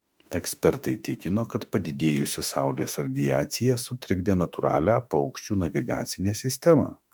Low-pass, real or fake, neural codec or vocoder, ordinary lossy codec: 19.8 kHz; fake; autoencoder, 48 kHz, 32 numbers a frame, DAC-VAE, trained on Japanese speech; MP3, 96 kbps